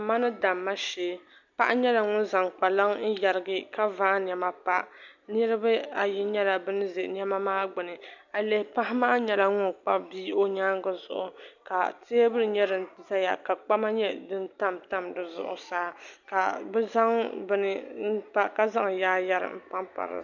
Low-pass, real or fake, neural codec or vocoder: 7.2 kHz; real; none